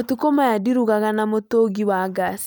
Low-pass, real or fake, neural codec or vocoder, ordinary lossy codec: none; real; none; none